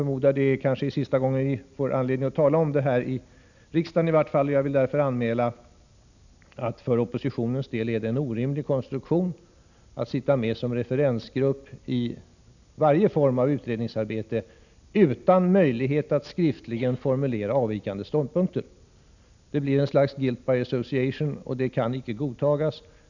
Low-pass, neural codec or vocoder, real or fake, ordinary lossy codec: 7.2 kHz; none; real; none